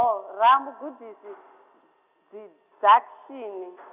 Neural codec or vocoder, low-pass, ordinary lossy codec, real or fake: none; 3.6 kHz; none; real